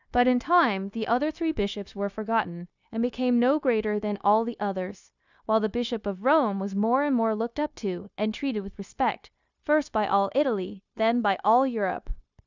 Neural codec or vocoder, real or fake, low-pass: codec, 16 kHz, 0.9 kbps, LongCat-Audio-Codec; fake; 7.2 kHz